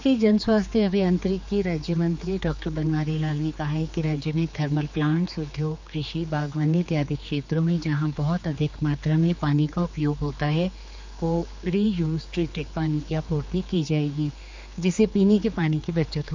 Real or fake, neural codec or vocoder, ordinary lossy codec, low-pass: fake; codec, 16 kHz, 4 kbps, X-Codec, HuBERT features, trained on general audio; MP3, 64 kbps; 7.2 kHz